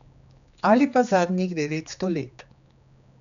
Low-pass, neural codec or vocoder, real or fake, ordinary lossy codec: 7.2 kHz; codec, 16 kHz, 2 kbps, X-Codec, HuBERT features, trained on general audio; fake; none